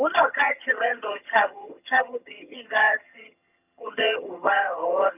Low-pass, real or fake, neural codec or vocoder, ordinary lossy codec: 3.6 kHz; fake; vocoder, 22.05 kHz, 80 mel bands, HiFi-GAN; none